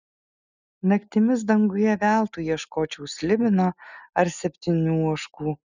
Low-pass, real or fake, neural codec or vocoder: 7.2 kHz; real; none